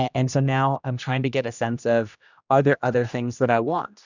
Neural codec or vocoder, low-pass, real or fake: codec, 16 kHz, 1 kbps, X-Codec, HuBERT features, trained on general audio; 7.2 kHz; fake